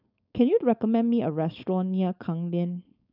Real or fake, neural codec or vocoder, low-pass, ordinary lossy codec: fake; codec, 16 kHz, 4.8 kbps, FACodec; 5.4 kHz; none